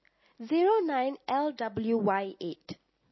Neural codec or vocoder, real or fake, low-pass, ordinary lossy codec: none; real; 7.2 kHz; MP3, 24 kbps